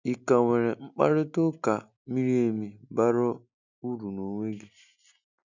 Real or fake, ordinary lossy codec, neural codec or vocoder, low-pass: real; none; none; 7.2 kHz